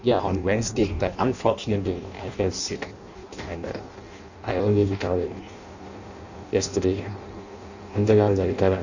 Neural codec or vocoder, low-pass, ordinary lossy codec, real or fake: codec, 16 kHz in and 24 kHz out, 0.6 kbps, FireRedTTS-2 codec; 7.2 kHz; none; fake